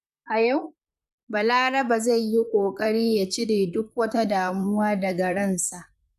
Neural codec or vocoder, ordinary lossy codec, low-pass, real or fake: vocoder, 44.1 kHz, 128 mel bands, Pupu-Vocoder; none; 14.4 kHz; fake